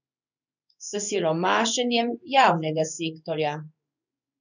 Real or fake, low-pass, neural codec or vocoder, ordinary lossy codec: fake; 7.2 kHz; codec, 16 kHz in and 24 kHz out, 1 kbps, XY-Tokenizer; none